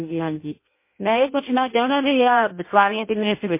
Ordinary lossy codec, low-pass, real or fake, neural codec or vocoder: MP3, 24 kbps; 3.6 kHz; fake; codec, 16 kHz in and 24 kHz out, 0.6 kbps, FireRedTTS-2 codec